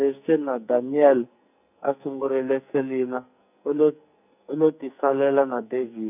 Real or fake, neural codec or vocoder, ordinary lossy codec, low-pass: fake; codec, 44.1 kHz, 2.6 kbps, SNAC; none; 3.6 kHz